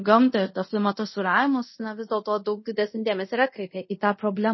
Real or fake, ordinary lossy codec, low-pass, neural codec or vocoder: fake; MP3, 24 kbps; 7.2 kHz; codec, 24 kHz, 0.5 kbps, DualCodec